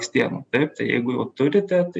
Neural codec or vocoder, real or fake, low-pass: none; real; 9.9 kHz